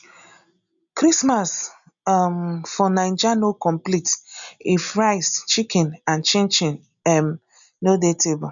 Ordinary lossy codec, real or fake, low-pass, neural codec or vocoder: none; real; 7.2 kHz; none